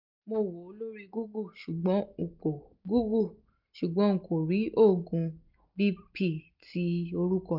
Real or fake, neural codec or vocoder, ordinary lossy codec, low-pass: real; none; none; 5.4 kHz